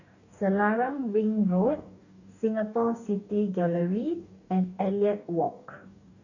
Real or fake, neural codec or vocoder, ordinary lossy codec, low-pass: fake; codec, 44.1 kHz, 2.6 kbps, DAC; none; 7.2 kHz